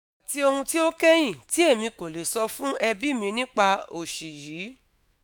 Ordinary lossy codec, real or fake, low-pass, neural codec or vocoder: none; fake; none; autoencoder, 48 kHz, 128 numbers a frame, DAC-VAE, trained on Japanese speech